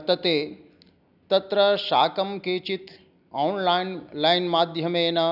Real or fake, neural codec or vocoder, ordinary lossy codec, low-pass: real; none; none; 5.4 kHz